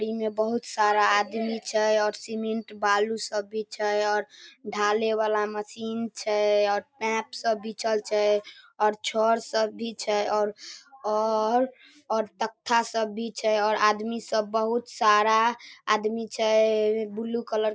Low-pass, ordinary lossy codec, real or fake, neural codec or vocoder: none; none; real; none